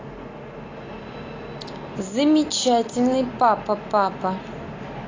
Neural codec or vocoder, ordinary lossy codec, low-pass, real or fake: none; AAC, 32 kbps; 7.2 kHz; real